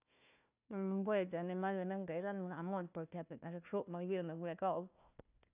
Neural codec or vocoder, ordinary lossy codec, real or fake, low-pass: codec, 16 kHz, 1 kbps, FunCodec, trained on Chinese and English, 50 frames a second; none; fake; 3.6 kHz